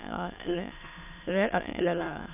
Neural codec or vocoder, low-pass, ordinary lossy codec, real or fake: autoencoder, 22.05 kHz, a latent of 192 numbers a frame, VITS, trained on many speakers; 3.6 kHz; none; fake